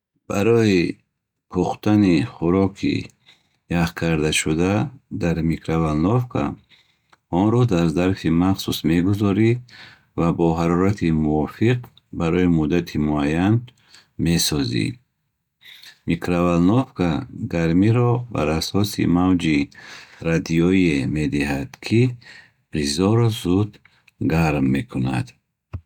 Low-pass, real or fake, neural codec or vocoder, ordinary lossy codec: 19.8 kHz; real; none; none